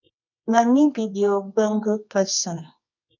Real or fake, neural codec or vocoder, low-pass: fake; codec, 24 kHz, 0.9 kbps, WavTokenizer, medium music audio release; 7.2 kHz